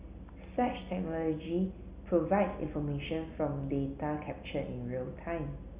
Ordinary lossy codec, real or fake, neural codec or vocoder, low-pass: Opus, 64 kbps; real; none; 3.6 kHz